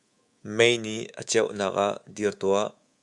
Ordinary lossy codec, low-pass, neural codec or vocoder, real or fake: Opus, 64 kbps; 10.8 kHz; codec, 24 kHz, 3.1 kbps, DualCodec; fake